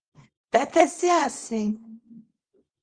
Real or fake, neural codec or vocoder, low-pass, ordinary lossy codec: fake; codec, 24 kHz, 0.9 kbps, WavTokenizer, small release; 9.9 kHz; Opus, 32 kbps